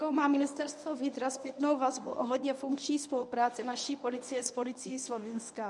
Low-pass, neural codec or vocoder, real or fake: 10.8 kHz; codec, 24 kHz, 0.9 kbps, WavTokenizer, medium speech release version 1; fake